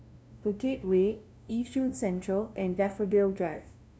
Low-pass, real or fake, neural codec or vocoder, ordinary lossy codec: none; fake; codec, 16 kHz, 0.5 kbps, FunCodec, trained on LibriTTS, 25 frames a second; none